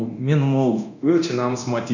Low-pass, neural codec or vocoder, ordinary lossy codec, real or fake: 7.2 kHz; codec, 24 kHz, 0.9 kbps, DualCodec; none; fake